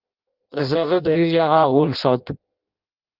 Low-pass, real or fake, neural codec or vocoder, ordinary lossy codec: 5.4 kHz; fake; codec, 16 kHz in and 24 kHz out, 0.6 kbps, FireRedTTS-2 codec; Opus, 24 kbps